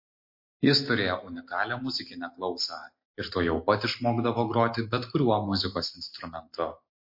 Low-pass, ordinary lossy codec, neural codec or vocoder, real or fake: 5.4 kHz; MP3, 32 kbps; none; real